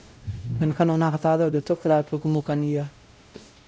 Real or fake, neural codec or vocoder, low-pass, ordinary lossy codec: fake; codec, 16 kHz, 0.5 kbps, X-Codec, WavLM features, trained on Multilingual LibriSpeech; none; none